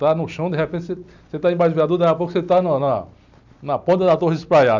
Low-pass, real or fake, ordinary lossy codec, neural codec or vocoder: 7.2 kHz; fake; none; vocoder, 44.1 kHz, 128 mel bands every 256 samples, BigVGAN v2